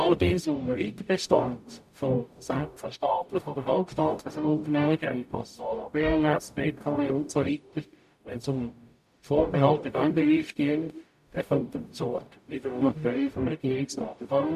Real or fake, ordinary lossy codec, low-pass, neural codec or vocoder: fake; none; 14.4 kHz; codec, 44.1 kHz, 0.9 kbps, DAC